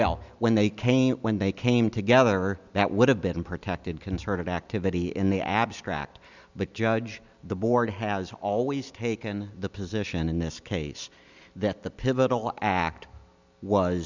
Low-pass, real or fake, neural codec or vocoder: 7.2 kHz; real; none